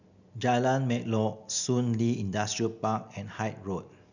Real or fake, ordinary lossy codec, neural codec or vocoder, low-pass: real; none; none; 7.2 kHz